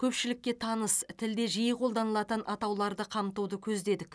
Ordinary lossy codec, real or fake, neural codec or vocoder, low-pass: none; real; none; none